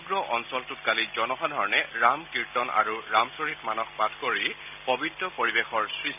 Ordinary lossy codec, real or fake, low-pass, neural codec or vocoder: none; real; 3.6 kHz; none